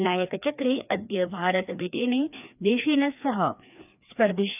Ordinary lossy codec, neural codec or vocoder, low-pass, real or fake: none; codec, 16 kHz, 2 kbps, FreqCodec, larger model; 3.6 kHz; fake